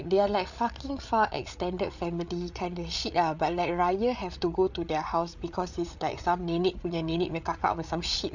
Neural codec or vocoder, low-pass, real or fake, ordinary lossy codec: codec, 16 kHz, 8 kbps, FreqCodec, larger model; 7.2 kHz; fake; none